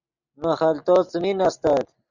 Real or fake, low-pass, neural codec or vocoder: real; 7.2 kHz; none